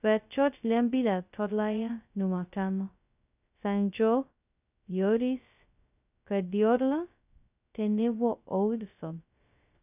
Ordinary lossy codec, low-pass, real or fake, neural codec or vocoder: none; 3.6 kHz; fake; codec, 16 kHz, 0.2 kbps, FocalCodec